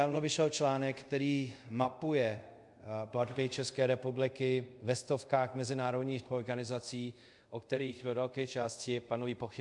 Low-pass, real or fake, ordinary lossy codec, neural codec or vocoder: 10.8 kHz; fake; MP3, 64 kbps; codec, 24 kHz, 0.5 kbps, DualCodec